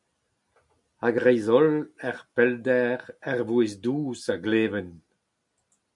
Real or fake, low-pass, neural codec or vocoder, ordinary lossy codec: real; 10.8 kHz; none; MP3, 64 kbps